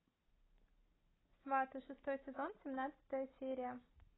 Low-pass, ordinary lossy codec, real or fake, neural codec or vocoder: 7.2 kHz; AAC, 16 kbps; real; none